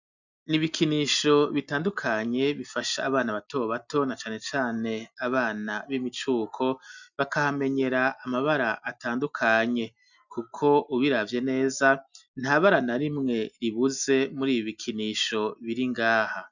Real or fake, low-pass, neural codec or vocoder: real; 7.2 kHz; none